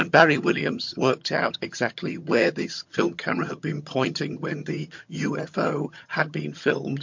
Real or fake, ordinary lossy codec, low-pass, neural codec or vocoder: fake; MP3, 48 kbps; 7.2 kHz; vocoder, 22.05 kHz, 80 mel bands, HiFi-GAN